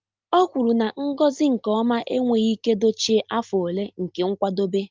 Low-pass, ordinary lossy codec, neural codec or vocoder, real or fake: 7.2 kHz; Opus, 32 kbps; none; real